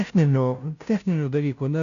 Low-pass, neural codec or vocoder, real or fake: 7.2 kHz; codec, 16 kHz, 0.5 kbps, FunCodec, trained on Chinese and English, 25 frames a second; fake